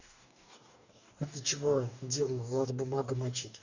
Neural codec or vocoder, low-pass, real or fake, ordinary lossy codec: codec, 44.1 kHz, 2.6 kbps, DAC; 7.2 kHz; fake; none